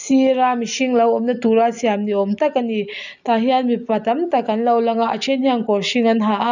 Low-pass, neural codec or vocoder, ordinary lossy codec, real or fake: 7.2 kHz; none; none; real